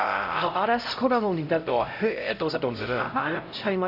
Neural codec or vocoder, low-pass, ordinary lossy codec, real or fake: codec, 16 kHz, 0.5 kbps, X-Codec, HuBERT features, trained on LibriSpeech; 5.4 kHz; AAC, 48 kbps; fake